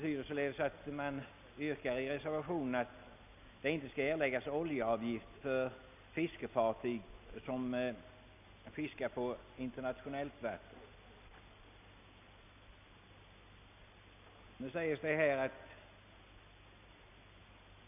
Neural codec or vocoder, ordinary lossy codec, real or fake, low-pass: none; none; real; 3.6 kHz